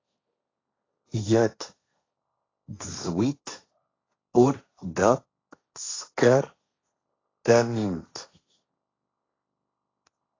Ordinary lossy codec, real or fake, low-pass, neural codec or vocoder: AAC, 32 kbps; fake; 7.2 kHz; codec, 16 kHz, 1.1 kbps, Voila-Tokenizer